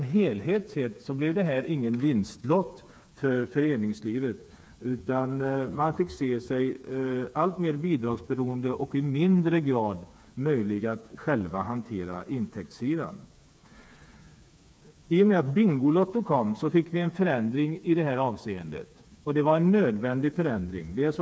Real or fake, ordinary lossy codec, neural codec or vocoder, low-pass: fake; none; codec, 16 kHz, 4 kbps, FreqCodec, smaller model; none